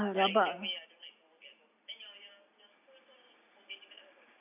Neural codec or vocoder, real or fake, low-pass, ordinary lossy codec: none; real; 3.6 kHz; none